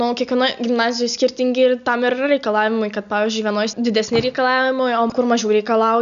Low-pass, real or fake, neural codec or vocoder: 7.2 kHz; real; none